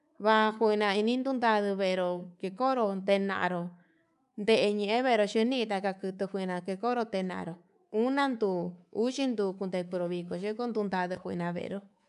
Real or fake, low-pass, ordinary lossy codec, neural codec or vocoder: fake; 10.8 kHz; none; codec, 24 kHz, 3.1 kbps, DualCodec